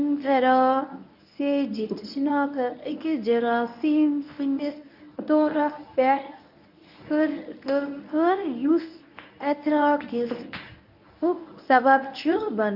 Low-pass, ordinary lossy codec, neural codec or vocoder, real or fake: 5.4 kHz; none; codec, 24 kHz, 0.9 kbps, WavTokenizer, medium speech release version 1; fake